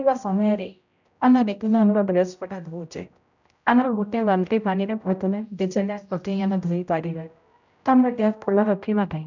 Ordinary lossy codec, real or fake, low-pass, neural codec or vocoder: none; fake; 7.2 kHz; codec, 16 kHz, 0.5 kbps, X-Codec, HuBERT features, trained on general audio